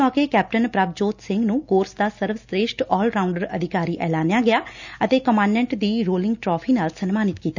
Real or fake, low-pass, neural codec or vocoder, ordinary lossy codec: real; 7.2 kHz; none; none